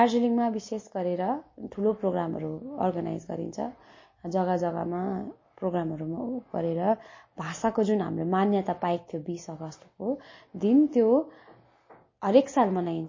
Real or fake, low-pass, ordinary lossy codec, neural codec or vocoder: real; 7.2 kHz; MP3, 32 kbps; none